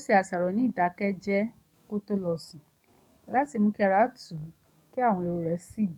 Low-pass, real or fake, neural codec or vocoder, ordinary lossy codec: 19.8 kHz; fake; codec, 44.1 kHz, 7.8 kbps, DAC; none